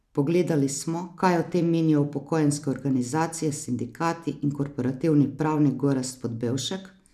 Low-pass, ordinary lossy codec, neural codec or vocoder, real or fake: 14.4 kHz; none; none; real